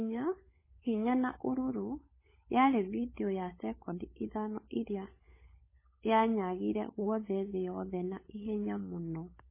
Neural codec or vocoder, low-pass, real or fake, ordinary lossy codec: codec, 16 kHz, 16 kbps, FunCodec, trained on LibriTTS, 50 frames a second; 3.6 kHz; fake; MP3, 16 kbps